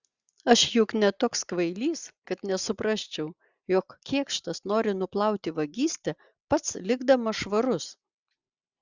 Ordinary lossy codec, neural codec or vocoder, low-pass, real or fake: Opus, 64 kbps; none; 7.2 kHz; real